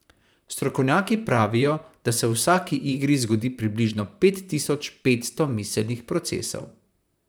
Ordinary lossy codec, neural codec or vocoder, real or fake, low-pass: none; vocoder, 44.1 kHz, 128 mel bands, Pupu-Vocoder; fake; none